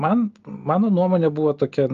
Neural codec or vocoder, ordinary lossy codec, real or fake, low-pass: none; Opus, 32 kbps; real; 7.2 kHz